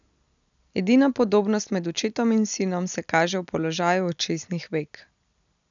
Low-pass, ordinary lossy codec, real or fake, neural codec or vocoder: 7.2 kHz; none; real; none